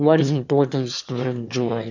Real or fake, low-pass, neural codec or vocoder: fake; 7.2 kHz; autoencoder, 22.05 kHz, a latent of 192 numbers a frame, VITS, trained on one speaker